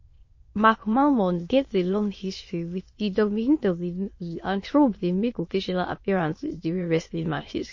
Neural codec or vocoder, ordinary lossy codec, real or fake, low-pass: autoencoder, 22.05 kHz, a latent of 192 numbers a frame, VITS, trained on many speakers; MP3, 32 kbps; fake; 7.2 kHz